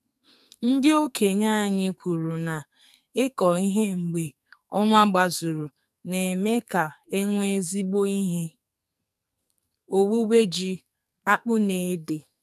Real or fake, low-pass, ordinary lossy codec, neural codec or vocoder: fake; 14.4 kHz; none; codec, 44.1 kHz, 2.6 kbps, SNAC